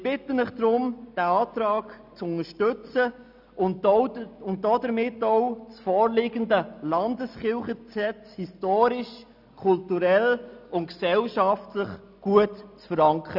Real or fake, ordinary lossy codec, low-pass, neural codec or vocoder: real; none; 5.4 kHz; none